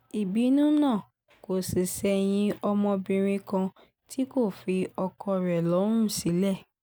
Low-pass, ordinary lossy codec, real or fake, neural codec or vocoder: none; none; real; none